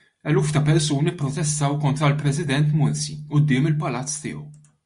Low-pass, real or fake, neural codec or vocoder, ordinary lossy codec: 10.8 kHz; real; none; MP3, 64 kbps